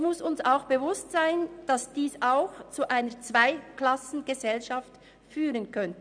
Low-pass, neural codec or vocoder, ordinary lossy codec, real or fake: 9.9 kHz; none; none; real